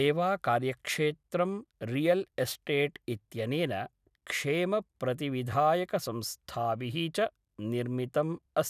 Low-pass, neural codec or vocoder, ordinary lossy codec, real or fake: 14.4 kHz; none; none; real